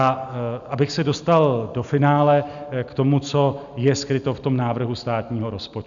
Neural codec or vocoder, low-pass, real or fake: none; 7.2 kHz; real